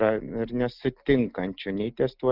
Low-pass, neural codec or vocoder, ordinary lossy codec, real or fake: 5.4 kHz; none; Opus, 24 kbps; real